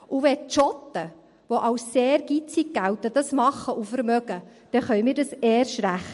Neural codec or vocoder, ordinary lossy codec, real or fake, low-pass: none; MP3, 48 kbps; real; 14.4 kHz